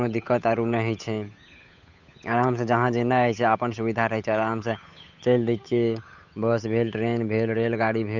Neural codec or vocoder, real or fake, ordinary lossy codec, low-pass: codec, 16 kHz, 8 kbps, FunCodec, trained on Chinese and English, 25 frames a second; fake; none; 7.2 kHz